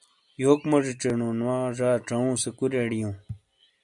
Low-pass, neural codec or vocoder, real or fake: 10.8 kHz; none; real